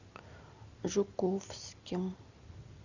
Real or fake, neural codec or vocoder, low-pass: real; none; 7.2 kHz